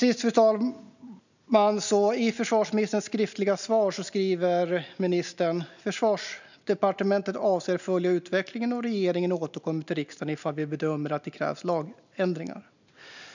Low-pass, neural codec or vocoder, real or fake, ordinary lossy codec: 7.2 kHz; none; real; none